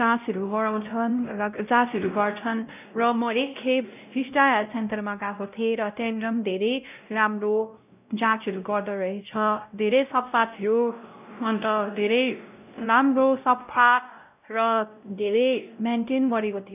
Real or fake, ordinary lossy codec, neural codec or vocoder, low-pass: fake; none; codec, 16 kHz, 0.5 kbps, X-Codec, WavLM features, trained on Multilingual LibriSpeech; 3.6 kHz